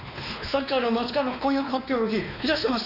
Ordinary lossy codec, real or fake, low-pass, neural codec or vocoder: none; fake; 5.4 kHz; codec, 16 kHz, 2 kbps, X-Codec, WavLM features, trained on Multilingual LibriSpeech